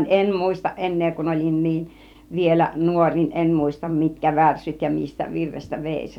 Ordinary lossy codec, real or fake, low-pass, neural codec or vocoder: none; fake; 19.8 kHz; vocoder, 44.1 kHz, 128 mel bands every 256 samples, BigVGAN v2